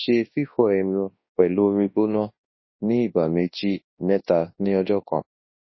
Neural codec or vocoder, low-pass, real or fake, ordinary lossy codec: codec, 24 kHz, 0.9 kbps, WavTokenizer, large speech release; 7.2 kHz; fake; MP3, 24 kbps